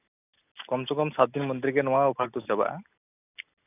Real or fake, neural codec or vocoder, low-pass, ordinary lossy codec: real; none; 3.6 kHz; none